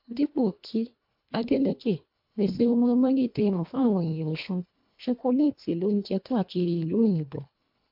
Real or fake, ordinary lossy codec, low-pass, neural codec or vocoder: fake; MP3, 48 kbps; 5.4 kHz; codec, 24 kHz, 1.5 kbps, HILCodec